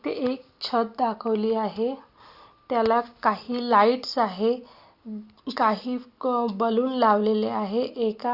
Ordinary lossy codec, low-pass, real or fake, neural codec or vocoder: Opus, 64 kbps; 5.4 kHz; real; none